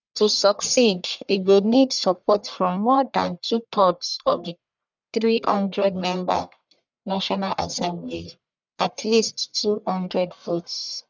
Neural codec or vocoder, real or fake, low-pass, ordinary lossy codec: codec, 44.1 kHz, 1.7 kbps, Pupu-Codec; fake; 7.2 kHz; none